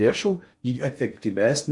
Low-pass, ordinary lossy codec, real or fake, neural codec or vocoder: 10.8 kHz; Opus, 64 kbps; fake; codec, 16 kHz in and 24 kHz out, 0.6 kbps, FocalCodec, streaming, 4096 codes